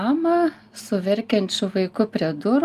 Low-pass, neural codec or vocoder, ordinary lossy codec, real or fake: 14.4 kHz; none; Opus, 32 kbps; real